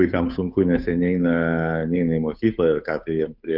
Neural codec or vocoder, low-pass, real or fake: codec, 16 kHz, 8 kbps, FunCodec, trained on Chinese and English, 25 frames a second; 5.4 kHz; fake